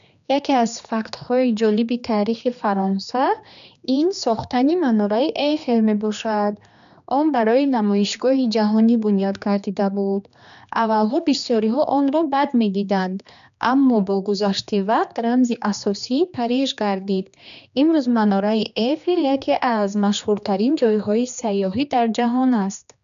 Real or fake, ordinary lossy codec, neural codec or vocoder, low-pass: fake; none; codec, 16 kHz, 2 kbps, X-Codec, HuBERT features, trained on general audio; 7.2 kHz